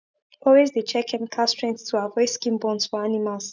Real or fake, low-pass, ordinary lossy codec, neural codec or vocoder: real; 7.2 kHz; none; none